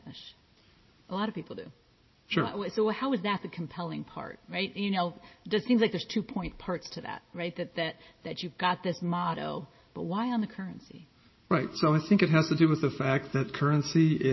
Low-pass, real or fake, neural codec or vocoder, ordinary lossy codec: 7.2 kHz; real; none; MP3, 24 kbps